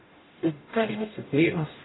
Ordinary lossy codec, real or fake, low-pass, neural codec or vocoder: AAC, 16 kbps; fake; 7.2 kHz; codec, 44.1 kHz, 0.9 kbps, DAC